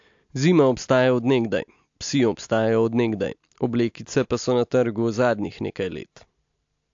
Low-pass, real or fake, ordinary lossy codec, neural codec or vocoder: 7.2 kHz; real; AAC, 64 kbps; none